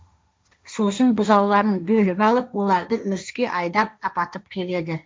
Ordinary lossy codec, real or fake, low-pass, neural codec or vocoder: none; fake; none; codec, 16 kHz, 1.1 kbps, Voila-Tokenizer